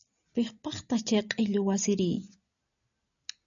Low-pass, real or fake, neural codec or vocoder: 7.2 kHz; real; none